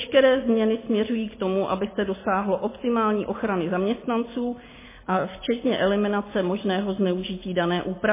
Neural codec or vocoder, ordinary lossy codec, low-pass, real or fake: none; MP3, 16 kbps; 3.6 kHz; real